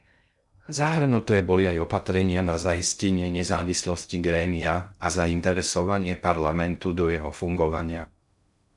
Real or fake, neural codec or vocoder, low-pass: fake; codec, 16 kHz in and 24 kHz out, 0.6 kbps, FocalCodec, streaming, 2048 codes; 10.8 kHz